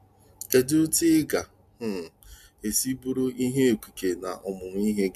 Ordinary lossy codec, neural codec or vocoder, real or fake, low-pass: none; none; real; 14.4 kHz